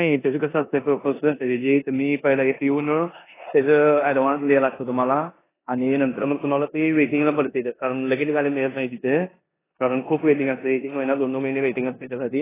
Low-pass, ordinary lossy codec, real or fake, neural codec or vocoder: 3.6 kHz; AAC, 16 kbps; fake; codec, 16 kHz in and 24 kHz out, 0.9 kbps, LongCat-Audio-Codec, four codebook decoder